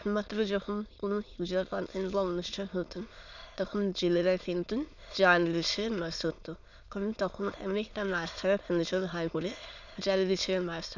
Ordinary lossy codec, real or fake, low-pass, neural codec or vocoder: none; fake; 7.2 kHz; autoencoder, 22.05 kHz, a latent of 192 numbers a frame, VITS, trained on many speakers